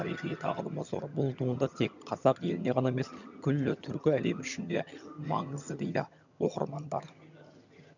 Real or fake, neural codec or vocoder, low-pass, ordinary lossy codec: fake; vocoder, 22.05 kHz, 80 mel bands, HiFi-GAN; 7.2 kHz; none